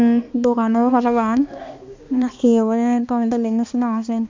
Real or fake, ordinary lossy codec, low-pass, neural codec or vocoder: fake; MP3, 64 kbps; 7.2 kHz; codec, 16 kHz, 2 kbps, X-Codec, HuBERT features, trained on balanced general audio